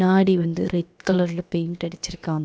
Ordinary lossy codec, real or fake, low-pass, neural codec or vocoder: none; fake; none; codec, 16 kHz, about 1 kbps, DyCAST, with the encoder's durations